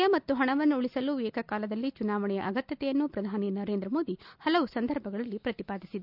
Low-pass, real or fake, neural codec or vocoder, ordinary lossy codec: 5.4 kHz; real; none; none